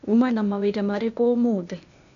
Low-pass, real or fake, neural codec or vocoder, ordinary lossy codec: 7.2 kHz; fake; codec, 16 kHz, 0.8 kbps, ZipCodec; none